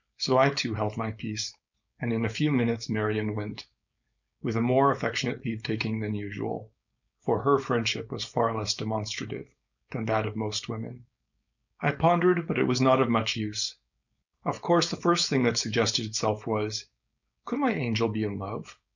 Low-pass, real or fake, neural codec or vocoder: 7.2 kHz; fake; codec, 16 kHz, 4.8 kbps, FACodec